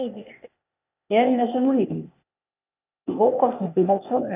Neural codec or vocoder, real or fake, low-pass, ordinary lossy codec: codec, 16 kHz, 0.8 kbps, ZipCodec; fake; 3.6 kHz; none